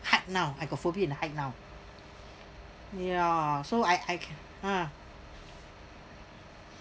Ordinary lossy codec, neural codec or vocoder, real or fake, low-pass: none; none; real; none